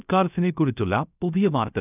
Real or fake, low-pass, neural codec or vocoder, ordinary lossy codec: fake; 3.6 kHz; codec, 16 kHz, 0.3 kbps, FocalCodec; none